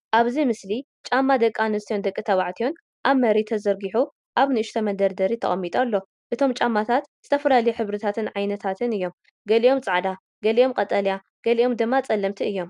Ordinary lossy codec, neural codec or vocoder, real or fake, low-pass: MP3, 96 kbps; none; real; 10.8 kHz